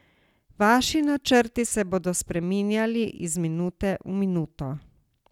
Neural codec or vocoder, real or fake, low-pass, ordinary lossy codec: none; real; 19.8 kHz; none